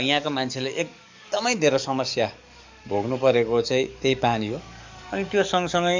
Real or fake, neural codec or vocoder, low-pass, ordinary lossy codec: fake; codec, 44.1 kHz, 7.8 kbps, DAC; 7.2 kHz; MP3, 64 kbps